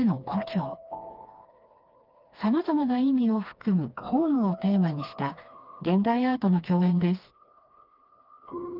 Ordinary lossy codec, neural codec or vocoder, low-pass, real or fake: Opus, 32 kbps; codec, 16 kHz, 2 kbps, FreqCodec, smaller model; 5.4 kHz; fake